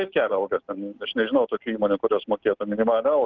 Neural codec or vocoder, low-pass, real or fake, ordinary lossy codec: none; 7.2 kHz; real; Opus, 32 kbps